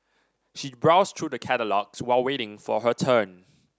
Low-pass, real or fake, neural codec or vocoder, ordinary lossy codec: none; real; none; none